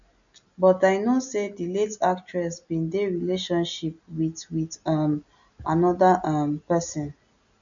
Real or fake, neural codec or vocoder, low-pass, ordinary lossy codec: real; none; 7.2 kHz; none